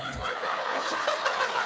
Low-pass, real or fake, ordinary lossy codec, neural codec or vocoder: none; fake; none; codec, 16 kHz, 4 kbps, FreqCodec, larger model